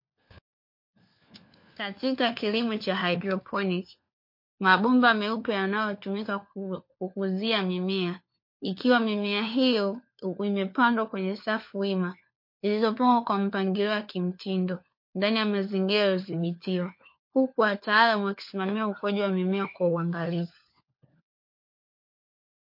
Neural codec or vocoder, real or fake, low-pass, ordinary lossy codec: codec, 16 kHz, 4 kbps, FunCodec, trained on LibriTTS, 50 frames a second; fake; 5.4 kHz; MP3, 32 kbps